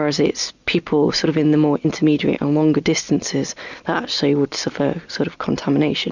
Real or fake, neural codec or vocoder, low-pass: real; none; 7.2 kHz